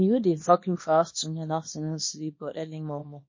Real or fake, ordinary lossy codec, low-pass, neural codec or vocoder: fake; MP3, 32 kbps; 7.2 kHz; codec, 24 kHz, 0.9 kbps, WavTokenizer, small release